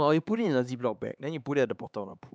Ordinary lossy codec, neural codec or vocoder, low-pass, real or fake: none; codec, 16 kHz, 4 kbps, X-Codec, HuBERT features, trained on LibriSpeech; none; fake